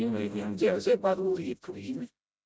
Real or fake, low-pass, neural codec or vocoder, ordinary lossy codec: fake; none; codec, 16 kHz, 0.5 kbps, FreqCodec, smaller model; none